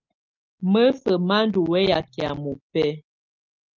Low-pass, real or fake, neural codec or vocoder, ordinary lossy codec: 7.2 kHz; real; none; Opus, 32 kbps